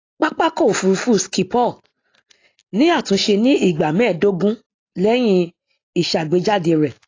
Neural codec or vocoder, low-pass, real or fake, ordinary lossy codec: none; 7.2 kHz; real; AAC, 32 kbps